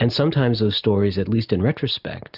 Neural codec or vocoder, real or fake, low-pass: none; real; 5.4 kHz